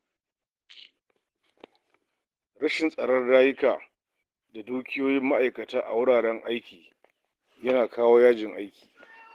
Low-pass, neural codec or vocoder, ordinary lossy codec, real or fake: 14.4 kHz; none; Opus, 16 kbps; real